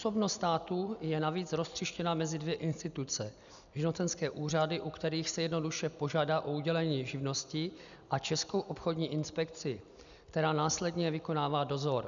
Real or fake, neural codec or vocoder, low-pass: real; none; 7.2 kHz